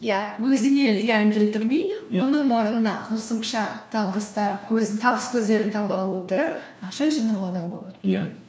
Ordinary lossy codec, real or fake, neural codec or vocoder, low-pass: none; fake; codec, 16 kHz, 1 kbps, FunCodec, trained on LibriTTS, 50 frames a second; none